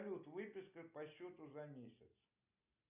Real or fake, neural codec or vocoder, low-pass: real; none; 3.6 kHz